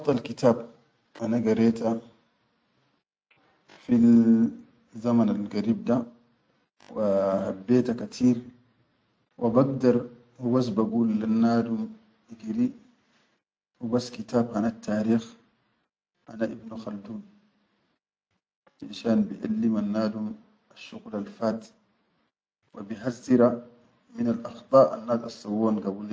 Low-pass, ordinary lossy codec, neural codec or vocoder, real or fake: none; none; none; real